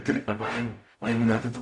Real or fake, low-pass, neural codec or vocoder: fake; 10.8 kHz; codec, 44.1 kHz, 0.9 kbps, DAC